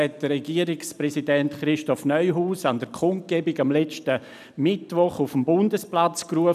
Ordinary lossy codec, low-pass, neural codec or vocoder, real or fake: none; 14.4 kHz; none; real